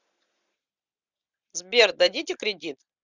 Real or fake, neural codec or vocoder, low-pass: real; none; 7.2 kHz